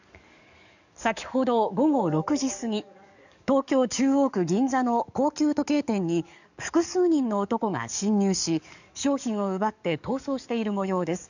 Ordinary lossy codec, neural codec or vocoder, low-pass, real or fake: none; codec, 44.1 kHz, 7.8 kbps, DAC; 7.2 kHz; fake